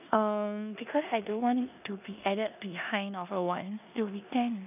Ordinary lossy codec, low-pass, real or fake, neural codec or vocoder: none; 3.6 kHz; fake; codec, 16 kHz in and 24 kHz out, 0.9 kbps, LongCat-Audio-Codec, four codebook decoder